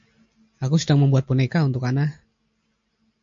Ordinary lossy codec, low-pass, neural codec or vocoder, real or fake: MP3, 48 kbps; 7.2 kHz; none; real